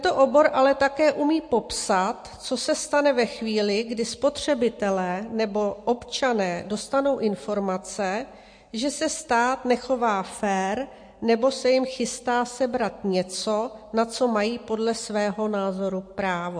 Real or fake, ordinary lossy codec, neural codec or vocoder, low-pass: real; MP3, 48 kbps; none; 9.9 kHz